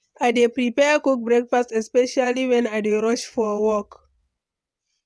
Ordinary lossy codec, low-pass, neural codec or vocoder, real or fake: none; none; vocoder, 22.05 kHz, 80 mel bands, WaveNeXt; fake